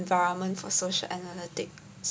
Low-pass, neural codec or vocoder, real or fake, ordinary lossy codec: none; none; real; none